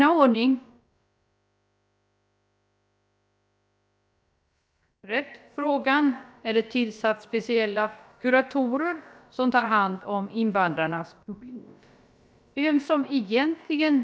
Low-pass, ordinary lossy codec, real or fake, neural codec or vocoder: none; none; fake; codec, 16 kHz, about 1 kbps, DyCAST, with the encoder's durations